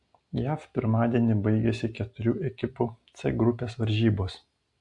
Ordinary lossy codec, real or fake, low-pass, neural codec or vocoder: AAC, 64 kbps; real; 10.8 kHz; none